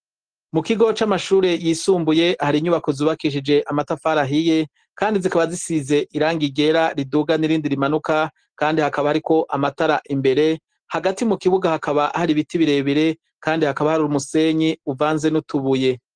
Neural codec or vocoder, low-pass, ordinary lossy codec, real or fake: none; 9.9 kHz; Opus, 16 kbps; real